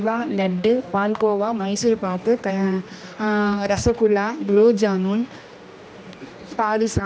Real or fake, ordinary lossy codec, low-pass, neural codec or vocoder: fake; none; none; codec, 16 kHz, 1 kbps, X-Codec, HuBERT features, trained on general audio